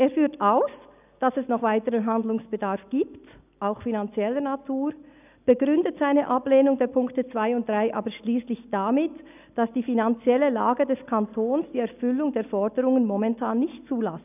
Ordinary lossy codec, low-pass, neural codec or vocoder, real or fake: none; 3.6 kHz; none; real